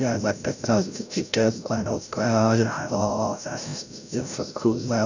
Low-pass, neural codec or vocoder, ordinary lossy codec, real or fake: 7.2 kHz; codec, 16 kHz, 0.5 kbps, FreqCodec, larger model; none; fake